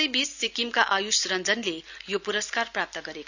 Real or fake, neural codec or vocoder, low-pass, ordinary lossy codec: real; none; 7.2 kHz; none